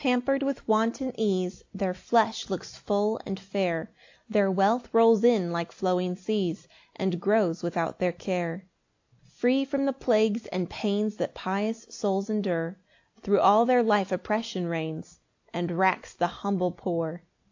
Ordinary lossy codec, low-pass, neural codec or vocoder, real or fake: AAC, 48 kbps; 7.2 kHz; none; real